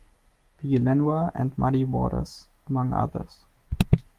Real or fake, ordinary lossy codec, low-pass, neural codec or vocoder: fake; Opus, 24 kbps; 14.4 kHz; autoencoder, 48 kHz, 128 numbers a frame, DAC-VAE, trained on Japanese speech